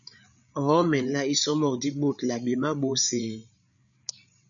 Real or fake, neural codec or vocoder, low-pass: fake; codec, 16 kHz, 8 kbps, FreqCodec, larger model; 7.2 kHz